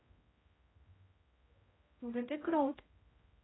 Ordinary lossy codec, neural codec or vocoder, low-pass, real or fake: AAC, 16 kbps; codec, 16 kHz, 0.5 kbps, X-Codec, HuBERT features, trained on general audio; 7.2 kHz; fake